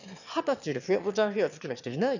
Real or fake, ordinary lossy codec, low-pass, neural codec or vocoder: fake; none; 7.2 kHz; autoencoder, 22.05 kHz, a latent of 192 numbers a frame, VITS, trained on one speaker